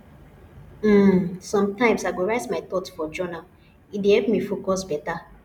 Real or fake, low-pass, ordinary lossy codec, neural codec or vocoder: real; 19.8 kHz; none; none